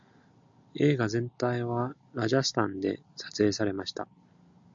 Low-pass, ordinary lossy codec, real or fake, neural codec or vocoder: 7.2 kHz; AAC, 64 kbps; real; none